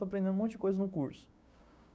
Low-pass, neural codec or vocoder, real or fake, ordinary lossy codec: none; codec, 16 kHz, 6 kbps, DAC; fake; none